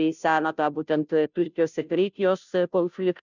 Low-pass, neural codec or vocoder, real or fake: 7.2 kHz; codec, 16 kHz, 0.5 kbps, FunCodec, trained on Chinese and English, 25 frames a second; fake